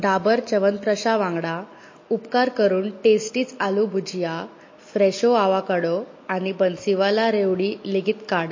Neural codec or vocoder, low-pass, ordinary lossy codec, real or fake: none; 7.2 kHz; MP3, 32 kbps; real